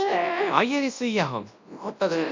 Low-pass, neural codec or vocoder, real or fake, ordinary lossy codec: 7.2 kHz; codec, 24 kHz, 0.9 kbps, WavTokenizer, large speech release; fake; MP3, 64 kbps